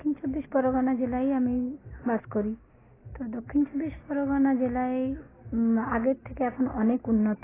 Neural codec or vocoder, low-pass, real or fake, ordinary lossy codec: none; 3.6 kHz; real; AAC, 16 kbps